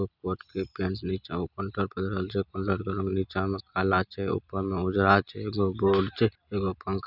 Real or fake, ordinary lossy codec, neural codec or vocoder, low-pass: real; none; none; 5.4 kHz